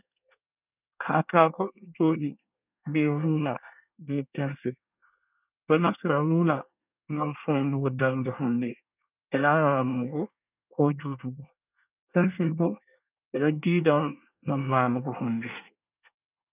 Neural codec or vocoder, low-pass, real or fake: codec, 24 kHz, 1 kbps, SNAC; 3.6 kHz; fake